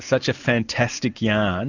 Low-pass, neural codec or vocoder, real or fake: 7.2 kHz; none; real